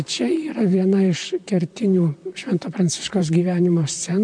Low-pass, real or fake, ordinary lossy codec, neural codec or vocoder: 9.9 kHz; fake; MP3, 64 kbps; vocoder, 44.1 kHz, 128 mel bands, Pupu-Vocoder